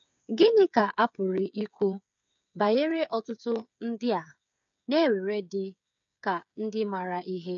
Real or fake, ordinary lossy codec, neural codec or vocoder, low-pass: fake; none; codec, 16 kHz, 8 kbps, FreqCodec, smaller model; 7.2 kHz